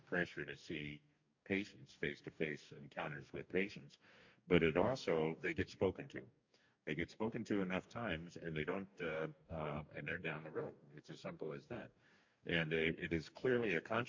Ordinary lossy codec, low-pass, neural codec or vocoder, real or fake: MP3, 48 kbps; 7.2 kHz; codec, 44.1 kHz, 2.6 kbps, DAC; fake